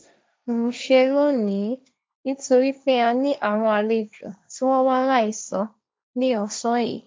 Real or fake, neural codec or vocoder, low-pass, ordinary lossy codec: fake; codec, 16 kHz, 1.1 kbps, Voila-Tokenizer; none; none